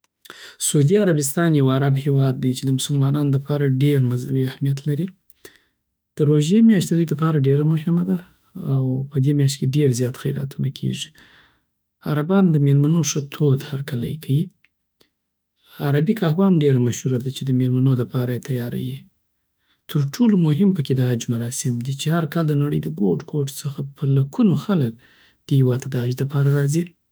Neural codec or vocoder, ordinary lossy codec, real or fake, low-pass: autoencoder, 48 kHz, 32 numbers a frame, DAC-VAE, trained on Japanese speech; none; fake; none